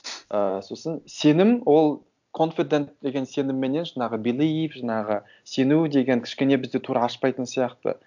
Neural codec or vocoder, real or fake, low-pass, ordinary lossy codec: none; real; 7.2 kHz; none